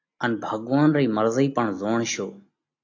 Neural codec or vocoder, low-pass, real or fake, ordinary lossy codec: none; 7.2 kHz; real; AAC, 48 kbps